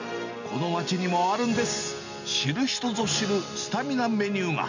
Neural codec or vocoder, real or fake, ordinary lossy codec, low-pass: none; real; none; 7.2 kHz